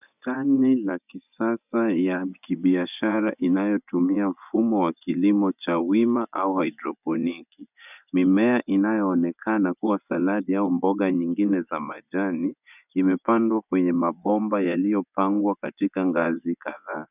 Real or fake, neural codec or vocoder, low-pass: fake; vocoder, 24 kHz, 100 mel bands, Vocos; 3.6 kHz